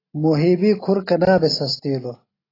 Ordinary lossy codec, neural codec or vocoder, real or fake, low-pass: AAC, 24 kbps; none; real; 5.4 kHz